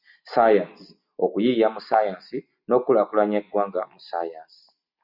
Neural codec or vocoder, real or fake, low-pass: none; real; 5.4 kHz